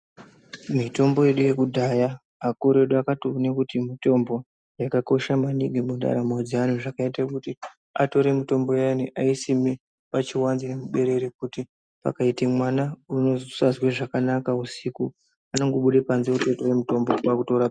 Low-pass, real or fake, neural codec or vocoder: 9.9 kHz; real; none